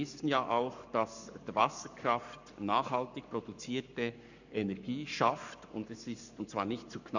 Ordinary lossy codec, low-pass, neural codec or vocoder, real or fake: none; 7.2 kHz; codec, 44.1 kHz, 7.8 kbps, Pupu-Codec; fake